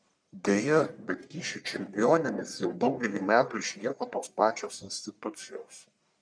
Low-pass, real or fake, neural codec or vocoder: 9.9 kHz; fake; codec, 44.1 kHz, 1.7 kbps, Pupu-Codec